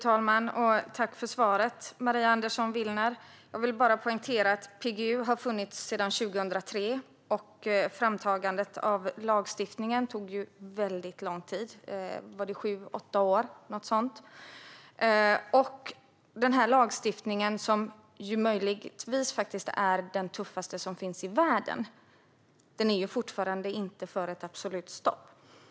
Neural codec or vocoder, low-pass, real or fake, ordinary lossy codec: none; none; real; none